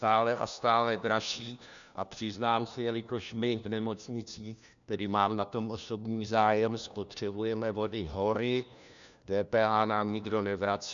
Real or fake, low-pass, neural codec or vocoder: fake; 7.2 kHz; codec, 16 kHz, 1 kbps, FunCodec, trained on LibriTTS, 50 frames a second